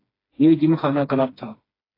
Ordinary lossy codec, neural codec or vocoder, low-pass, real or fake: AAC, 24 kbps; codec, 16 kHz, 2 kbps, FreqCodec, smaller model; 5.4 kHz; fake